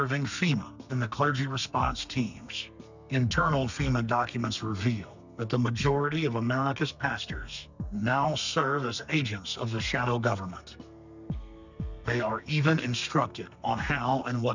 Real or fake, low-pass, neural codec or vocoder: fake; 7.2 kHz; codec, 32 kHz, 1.9 kbps, SNAC